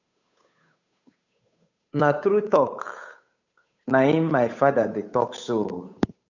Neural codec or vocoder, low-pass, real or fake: codec, 16 kHz, 8 kbps, FunCodec, trained on Chinese and English, 25 frames a second; 7.2 kHz; fake